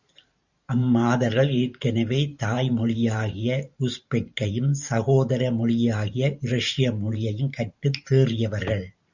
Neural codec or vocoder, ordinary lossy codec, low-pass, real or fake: vocoder, 44.1 kHz, 128 mel bands every 512 samples, BigVGAN v2; Opus, 64 kbps; 7.2 kHz; fake